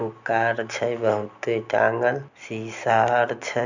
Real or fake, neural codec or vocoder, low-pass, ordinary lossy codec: real; none; 7.2 kHz; none